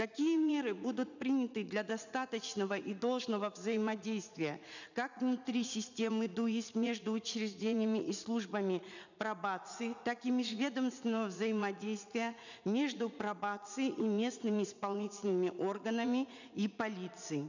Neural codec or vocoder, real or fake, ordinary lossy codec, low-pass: vocoder, 44.1 kHz, 80 mel bands, Vocos; fake; none; 7.2 kHz